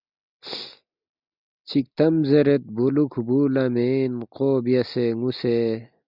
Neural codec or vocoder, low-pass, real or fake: none; 5.4 kHz; real